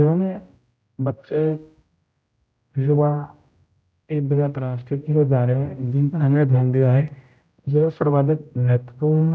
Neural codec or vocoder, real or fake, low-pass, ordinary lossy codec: codec, 16 kHz, 0.5 kbps, X-Codec, HuBERT features, trained on general audio; fake; none; none